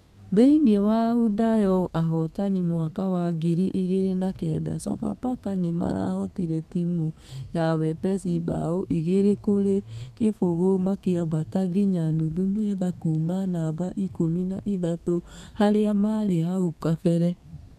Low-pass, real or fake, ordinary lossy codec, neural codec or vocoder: 14.4 kHz; fake; none; codec, 32 kHz, 1.9 kbps, SNAC